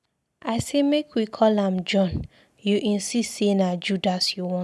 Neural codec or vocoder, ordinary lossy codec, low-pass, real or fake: none; none; none; real